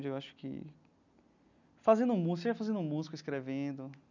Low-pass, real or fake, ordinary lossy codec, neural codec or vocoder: 7.2 kHz; real; none; none